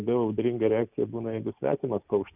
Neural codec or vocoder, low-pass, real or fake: none; 3.6 kHz; real